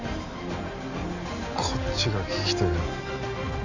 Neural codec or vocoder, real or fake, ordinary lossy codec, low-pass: none; real; none; 7.2 kHz